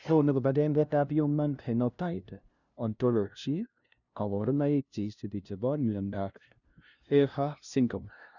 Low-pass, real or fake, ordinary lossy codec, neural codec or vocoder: 7.2 kHz; fake; none; codec, 16 kHz, 0.5 kbps, FunCodec, trained on LibriTTS, 25 frames a second